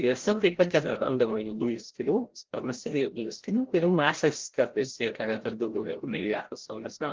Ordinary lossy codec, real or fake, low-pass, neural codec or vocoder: Opus, 16 kbps; fake; 7.2 kHz; codec, 16 kHz, 0.5 kbps, FreqCodec, larger model